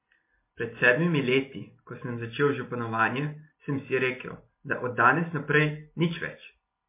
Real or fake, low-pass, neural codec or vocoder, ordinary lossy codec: real; 3.6 kHz; none; MP3, 24 kbps